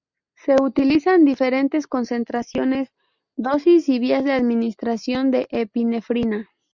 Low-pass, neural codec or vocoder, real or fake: 7.2 kHz; none; real